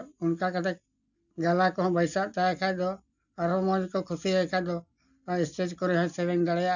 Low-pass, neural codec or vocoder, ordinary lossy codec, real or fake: 7.2 kHz; none; none; real